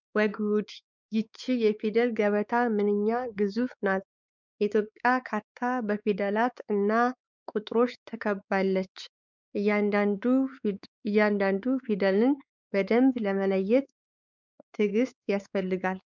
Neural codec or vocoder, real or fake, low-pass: codec, 16 kHz, 4 kbps, X-Codec, WavLM features, trained on Multilingual LibriSpeech; fake; 7.2 kHz